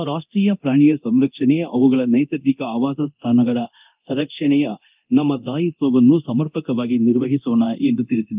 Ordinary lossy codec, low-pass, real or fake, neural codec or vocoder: Opus, 24 kbps; 3.6 kHz; fake; codec, 24 kHz, 0.9 kbps, DualCodec